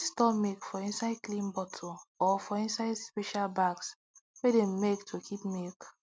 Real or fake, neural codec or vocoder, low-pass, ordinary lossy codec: real; none; none; none